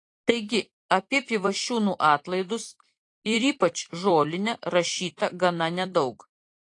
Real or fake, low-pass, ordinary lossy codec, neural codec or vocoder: fake; 10.8 kHz; AAC, 48 kbps; vocoder, 44.1 kHz, 128 mel bands every 256 samples, BigVGAN v2